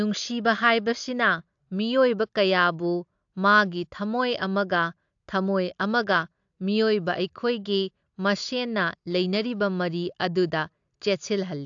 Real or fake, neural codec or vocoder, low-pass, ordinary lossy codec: real; none; 7.2 kHz; AAC, 64 kbps